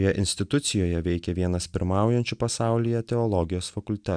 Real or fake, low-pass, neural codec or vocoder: real; 9.9 kHz; none